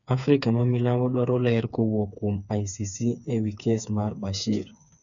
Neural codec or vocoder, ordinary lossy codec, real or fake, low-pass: codec, 16 kHz, 4 kbps, FreqCodec, smaller model; none; fake; 7.2 kHz